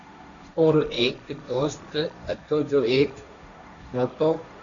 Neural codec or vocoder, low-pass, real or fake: codec, 16 kHz, 1.1 kbps, Voila-Tokenizer; 7.2 kHz; fake